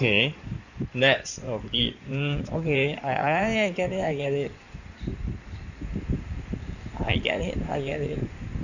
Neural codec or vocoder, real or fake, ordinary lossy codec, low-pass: codec, 16 kHz in and 24 kHz out, 2.2 kbps, FireRedTTS-2 codec; fake; none; 7.2 kHz